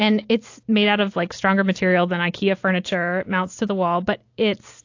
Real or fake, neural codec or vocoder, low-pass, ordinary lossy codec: real; none; 7.2 kHz; AAC, 48 kbps